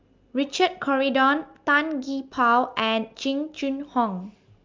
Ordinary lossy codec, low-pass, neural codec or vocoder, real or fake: Opus, 24 kbps; 7.2 kHz; none; real